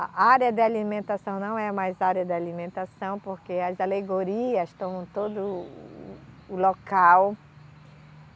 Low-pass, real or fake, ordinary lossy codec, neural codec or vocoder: none; real; none; none